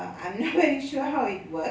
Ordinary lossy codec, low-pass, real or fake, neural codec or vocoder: none; none; real; none